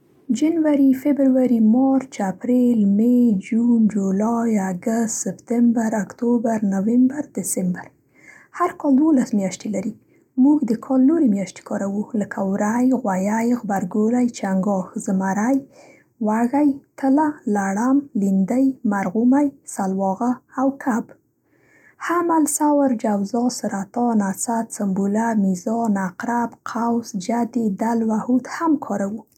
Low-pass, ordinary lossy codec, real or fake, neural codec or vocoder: 19.8 kHz; none; real; none